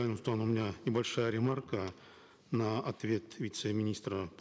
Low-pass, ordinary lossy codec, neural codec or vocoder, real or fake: none; none; none; real